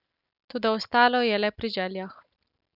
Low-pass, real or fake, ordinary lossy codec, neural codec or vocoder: 5.4 kHz; real; none; none